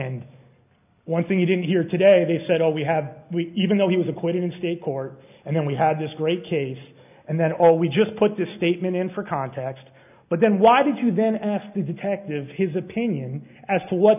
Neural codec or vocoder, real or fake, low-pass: none; real; 3.6 kHz